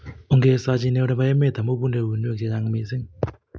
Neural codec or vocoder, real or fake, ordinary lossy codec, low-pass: none; real; none; none